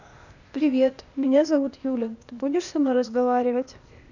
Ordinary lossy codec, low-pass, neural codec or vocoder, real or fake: none; 7.2 kHz; codec, 16 kHz, 0.8 kbps, ZipCodec; fake